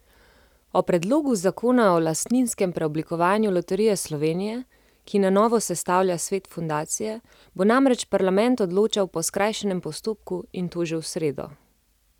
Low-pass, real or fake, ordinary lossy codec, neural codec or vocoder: 19.8 kHz; real; none; none